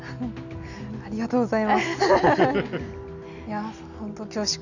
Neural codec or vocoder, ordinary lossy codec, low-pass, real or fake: none; none; 7.2 kHz; real